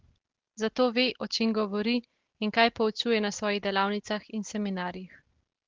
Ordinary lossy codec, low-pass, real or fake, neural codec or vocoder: Opus, 16 kbps; 7.2 kHz; real; none